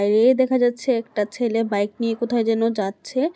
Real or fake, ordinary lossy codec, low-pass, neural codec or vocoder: real; none; none; none